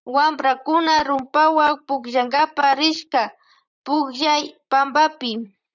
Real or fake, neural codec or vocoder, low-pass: fake; vocoder, 44.1 kHz, 128 mel bands, Pupu-Vocoder; 7.2 kHz